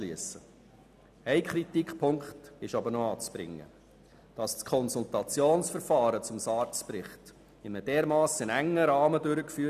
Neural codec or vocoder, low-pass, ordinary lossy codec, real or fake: none; 14.4 kHz; none; real